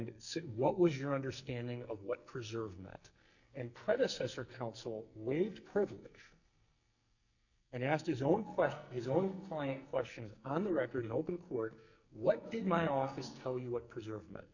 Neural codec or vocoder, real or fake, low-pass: codec, 44.1 kHz, 2.6 kbps, SNAC; fake; 7.2 kHz